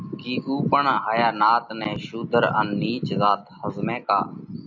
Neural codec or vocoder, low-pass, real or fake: none; 7.2 kHz; real